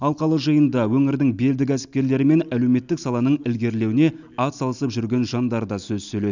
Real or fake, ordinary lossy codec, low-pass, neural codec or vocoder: real; none; 7.2 kHz; none